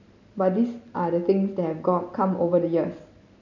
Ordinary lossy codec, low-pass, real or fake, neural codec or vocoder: none; 7.2 kHz; real; none